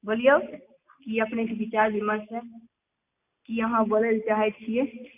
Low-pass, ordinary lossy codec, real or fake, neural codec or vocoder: 3.6 kHz; none; real; none